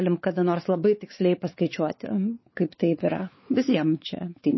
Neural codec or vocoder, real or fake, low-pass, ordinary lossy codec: codec, 16 kHz, 4 kbps, X-Codec, WavLM features, trained on Multilingual LibriSpeech; fake; 7.2 kHz; MP3, 24 kbps